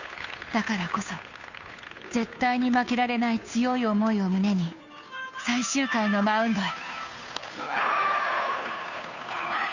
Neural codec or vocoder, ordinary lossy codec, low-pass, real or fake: codec, 16 kHz, 2 kbps, FunCodec, trained on Chinese and English, 25 frames a second; none; 7.2 kHz; fake